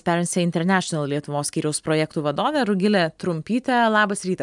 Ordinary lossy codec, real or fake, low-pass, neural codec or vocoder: MP3, 96 kbps; real; 10.8 kHz; none